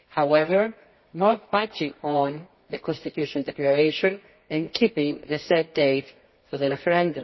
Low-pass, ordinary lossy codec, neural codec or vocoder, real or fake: 7.2 kHz; MP3, 24 kbps; codec, 24 kHz, 0.9 kbps, WavTokenizer, medium music audio release; fake